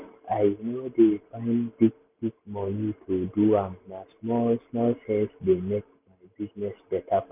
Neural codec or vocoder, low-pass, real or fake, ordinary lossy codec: none; 3.6 kHz; real; none